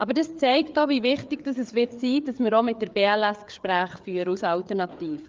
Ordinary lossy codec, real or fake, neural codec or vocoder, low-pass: Opus, 24 kbps; fake; codec, 16 kHz, 4 kbps, FreqCodec, larger model; 7.2 kHz